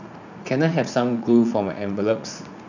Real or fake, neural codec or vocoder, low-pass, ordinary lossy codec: fake; vocoder, 44.1 kHz, 80 mel bands, Vocos; 7.2 kHz; none